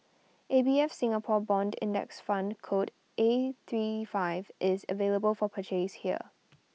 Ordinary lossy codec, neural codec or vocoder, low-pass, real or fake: none; none; none; real